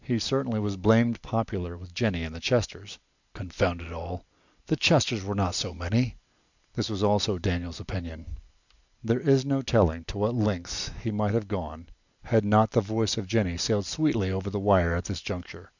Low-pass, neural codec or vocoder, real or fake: 7.2 kHz; none; real